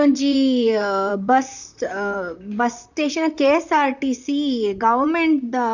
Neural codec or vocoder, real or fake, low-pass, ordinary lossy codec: vocoder, 44.1 kHz, 128 mel bands, Pupu-Vocoder; fake; 7.2 kHz; none